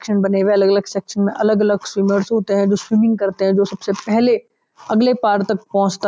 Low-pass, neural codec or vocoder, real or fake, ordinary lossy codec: none; none; real; none